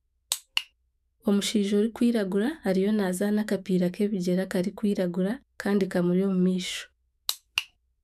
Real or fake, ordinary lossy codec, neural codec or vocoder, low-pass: fake; none; autoencoder, 48 kHz, 128 numbers a frame, DAC-VAE, trained on Japanese speech; 14.4 kHz